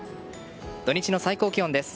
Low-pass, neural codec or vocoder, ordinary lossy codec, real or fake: none; none; none; real